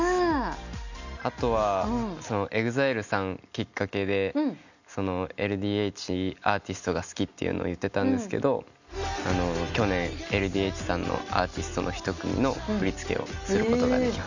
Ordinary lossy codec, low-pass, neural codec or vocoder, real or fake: none; 7.2 kHz; none; real